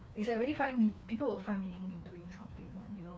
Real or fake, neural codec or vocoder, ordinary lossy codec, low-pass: fake; codec, 16 kHz, 2 kbps, FreqCodec, larger model; none; none